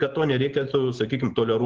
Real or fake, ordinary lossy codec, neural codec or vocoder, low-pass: real; Opus, 16 kbps; none; 7.2 kHz